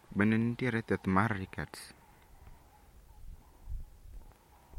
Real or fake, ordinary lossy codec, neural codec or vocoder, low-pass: real; MP3, 64 kbps; none; 19.8 kHz